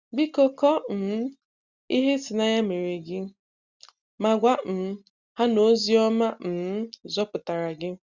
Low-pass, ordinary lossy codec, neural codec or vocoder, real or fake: 7.2 kHz; Opus, 64 kbps; none; real